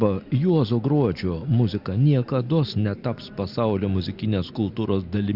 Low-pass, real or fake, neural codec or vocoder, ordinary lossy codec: 5.4 kHz; real; none; Opus, 64 kbps